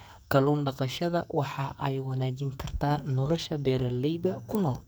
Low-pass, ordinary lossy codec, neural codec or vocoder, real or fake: none; none; codec, 44.1 kHz, 2.6 kbps, SNAC; fake